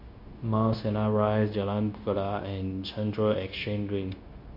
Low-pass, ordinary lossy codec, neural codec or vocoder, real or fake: 5.4 kHz; MP3, 32 kbps; codec, 16 kHz, 0.9 kbps, LongCat-Audio-Codec; fake